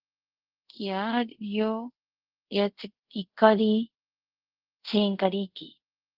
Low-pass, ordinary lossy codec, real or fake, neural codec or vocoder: 5.4 kHz; Opus, 16 kbps; fake; codec, 24 kHz, 0.5 kbps, DualCodec